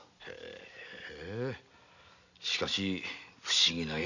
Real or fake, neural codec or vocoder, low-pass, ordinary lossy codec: real; none; 7.2 kHz; none